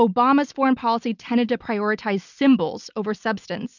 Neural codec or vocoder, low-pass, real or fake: none; 7.2 kHz; real